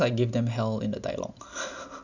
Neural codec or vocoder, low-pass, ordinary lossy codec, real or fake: none; 7.2 kHz; none; real